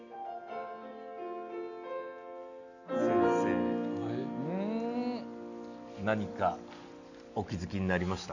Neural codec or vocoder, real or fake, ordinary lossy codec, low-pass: none; real; none; 7.2 kHz